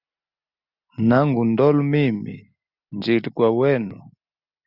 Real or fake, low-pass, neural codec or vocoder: real; 5.4 kHz; none